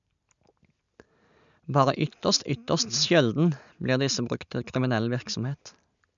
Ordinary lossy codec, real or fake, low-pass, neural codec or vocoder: none; real; 7.2 kHz; none